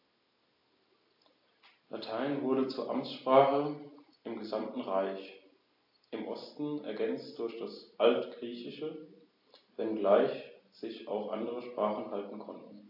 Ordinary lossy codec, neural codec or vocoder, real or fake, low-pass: AAC, 48 kbps; none; real; 5.4 kHz